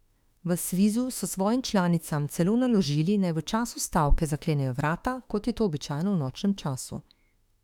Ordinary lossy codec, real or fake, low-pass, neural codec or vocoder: none; fake; 19.8 kHz; autoencoder, 48 kHz, 32 numbers a frame, DAC-VAE, trained on Japanese speech